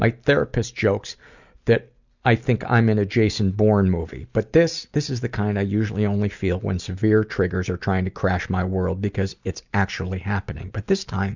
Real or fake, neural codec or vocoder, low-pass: real; none; 7.2 kHz